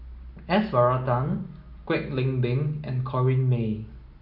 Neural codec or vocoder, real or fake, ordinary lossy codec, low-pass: none; real; none; 5.4 kHz